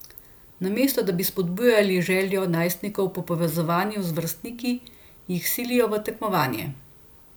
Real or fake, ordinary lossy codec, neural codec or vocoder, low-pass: real; none; none; none